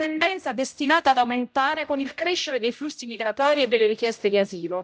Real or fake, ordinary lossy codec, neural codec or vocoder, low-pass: fake; none; codec, 16 kHz, 0.5 kbps, X-Codec, HuBERT features, trained on general audio; none